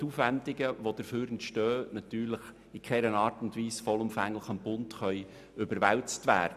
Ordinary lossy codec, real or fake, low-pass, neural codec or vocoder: none; real; 14.4 kHz; none